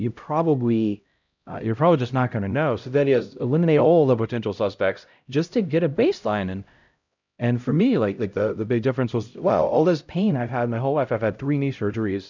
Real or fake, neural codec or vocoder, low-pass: fake; codec, 16 kHz, 0.5 kbps, X-Codec, HuBERT features, trained on LibriSpeech; 7.2 kHz